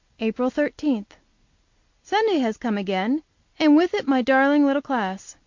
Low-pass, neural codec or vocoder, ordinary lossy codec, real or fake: 7.2 kHz; none; MP3, 48 kbps; real